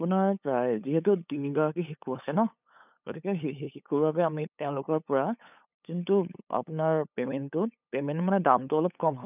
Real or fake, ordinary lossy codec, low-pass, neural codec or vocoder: fake; none; 3.6 kHz; codec, 16 kHz, 8 kbps, FunCodec, trained on LibriTTS, 25 frames a second